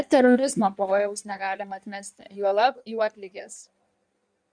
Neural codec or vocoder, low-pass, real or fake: codec, 16 kHz in and 24 kHz out, 1.1 kbps, FireRedTTS-2 codec; 9.9 kHz; fake